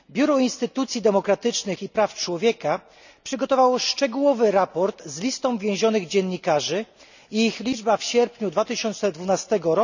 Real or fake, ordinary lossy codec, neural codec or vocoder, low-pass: real; none; none; 7.2 kHz